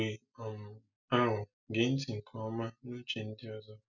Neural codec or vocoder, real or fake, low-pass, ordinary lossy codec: none; real; 7.2 kHz; none